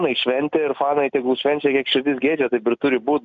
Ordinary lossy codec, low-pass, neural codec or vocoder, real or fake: MP3, 64 kbps; 7.2 kHz; none; real